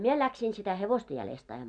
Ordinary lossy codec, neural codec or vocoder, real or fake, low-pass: none; none; real; 9.9 kHz